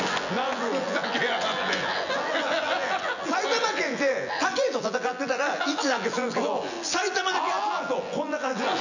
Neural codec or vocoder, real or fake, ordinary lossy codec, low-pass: vocoder, 24 kHz, 100 mel bands, Vocos; fake; none; 7.2 kHz